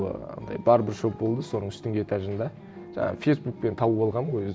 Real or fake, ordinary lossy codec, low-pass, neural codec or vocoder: real; none; none; none